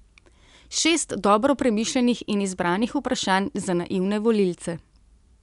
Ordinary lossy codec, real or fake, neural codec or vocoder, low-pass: none; real; none; 10.8 kHz